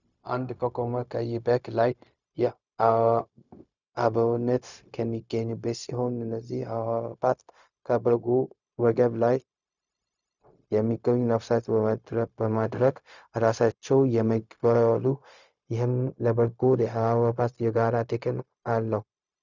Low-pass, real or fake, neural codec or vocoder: 7.2 kHz; fake; codec, 16 kHz, 0.4 kbps, LongCat-Audio-Codec